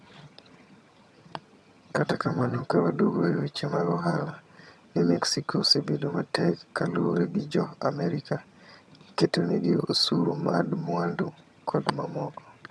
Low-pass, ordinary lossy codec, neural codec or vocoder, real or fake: none; none; vocoder, 22.05 kHz, 80 mel bands, HiFi-GAN; fake